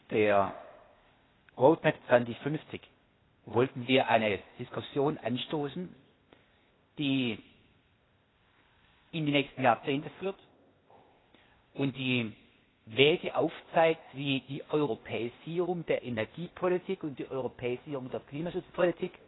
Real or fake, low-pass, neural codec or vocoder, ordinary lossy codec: fake; 7.2 kHz; codec, 16 kHz, 0.8 kbps, ZipCodec; AAC, 16 kbps